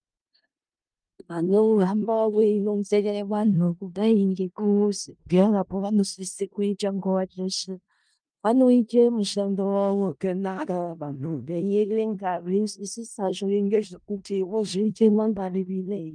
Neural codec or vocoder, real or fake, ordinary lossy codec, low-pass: codec, 16 kHz in and 24 kHz out, 0.4 kbps, LongCat-Audio-Codec, four codebook decoder; fake; Opus, 32 kbps; 9.9 kHz